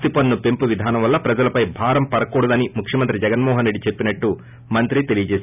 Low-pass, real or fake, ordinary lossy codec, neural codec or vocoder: 3.6 kHz; real; none; none